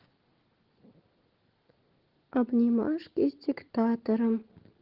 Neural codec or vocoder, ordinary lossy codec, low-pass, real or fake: none; Opus, 16 kbps; 5.4 kHz; real